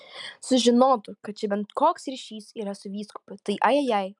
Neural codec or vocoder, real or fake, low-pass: none; real; 10.8 kHz